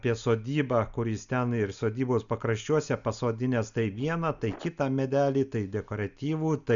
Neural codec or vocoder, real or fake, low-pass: none; real; 7.2 kHz